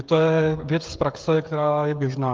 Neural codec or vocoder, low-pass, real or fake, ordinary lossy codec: codec, 16 kHz, 16 kbps, FreqCodec, smaller model; 7.2 kHz; fake; Opus, 32 kbps